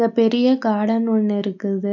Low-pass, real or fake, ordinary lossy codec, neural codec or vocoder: 7.2 kHz; real; none; none